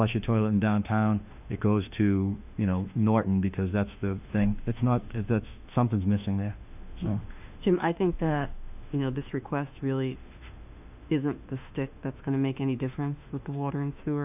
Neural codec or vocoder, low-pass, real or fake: autoencoder, 48 kHz, 32 numbers a frame, DAC-VAE, trained on Japanese speech; 3.6 kHz; fake